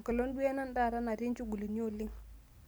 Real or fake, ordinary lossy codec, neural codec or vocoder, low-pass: real; none; none; none